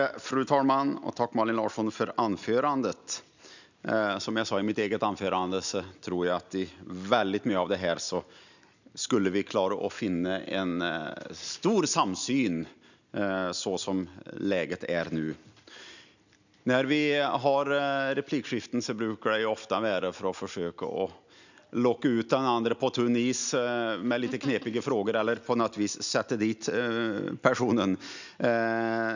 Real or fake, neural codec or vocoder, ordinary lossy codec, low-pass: real; none; none; 7.2 kHz